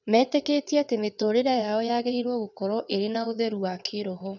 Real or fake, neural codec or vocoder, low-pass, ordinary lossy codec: fake; vocoder, 22.05 kHz, 80 mel bands, WaveNeXt; 7.2 kHz; none